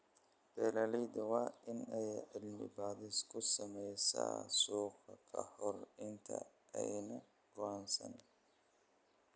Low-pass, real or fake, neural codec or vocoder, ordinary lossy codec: none; real; none; none